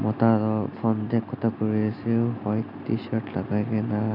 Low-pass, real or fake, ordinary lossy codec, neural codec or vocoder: 5.4 kHz; real; none; none